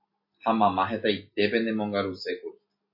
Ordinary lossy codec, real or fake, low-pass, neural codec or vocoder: MP3, 32 kbps; real; 5.4 kHz; none